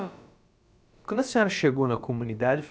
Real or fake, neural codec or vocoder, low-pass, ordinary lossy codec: fake; codec, 16 kHz, about 1 kbps, DyCAST, with the encoder's durations; none; none